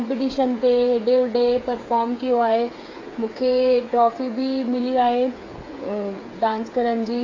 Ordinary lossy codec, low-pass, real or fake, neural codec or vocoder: AAC, 32 kbps; 7.2 kHz; fake; codec, 16 kHz, 8 kbps, FreqCodec, smaller model